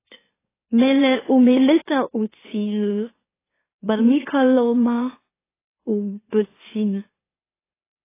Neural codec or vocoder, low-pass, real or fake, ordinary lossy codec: autoencoder, 44.1 kHz, a latent of 192 numbers a frame, MeloTTS; 3.6 kHz; fake; AAC, 16 kbps